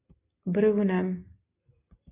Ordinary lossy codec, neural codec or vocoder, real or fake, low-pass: AAC, 32 kbps; none; real; 3.6 kHz